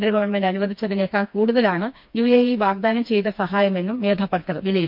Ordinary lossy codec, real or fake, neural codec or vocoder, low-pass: MP3, 48 kbps; fake; codec, 16 kHz, 2 kbps, FreqCodec, smaller model; 5.4 kHz